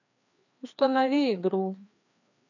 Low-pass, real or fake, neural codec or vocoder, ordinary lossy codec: 7.2 kHz; fake; codec, 16 kHz, 2 kbps, FreqCodec, larger model; none